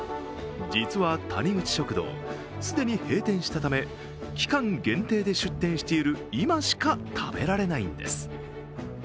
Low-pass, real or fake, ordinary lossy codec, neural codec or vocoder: none; real; none; none